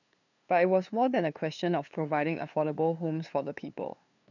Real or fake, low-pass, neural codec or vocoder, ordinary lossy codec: fake; 7.2 kHz; codec, 16 kHz, 2 kbps, FunCodec, trained on LibriTTS, 25 frames a second; none